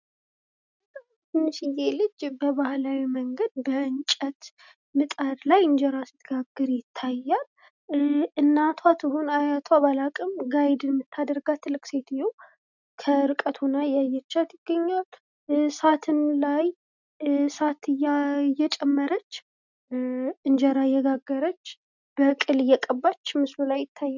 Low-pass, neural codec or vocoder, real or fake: 7.2 kHz; none; real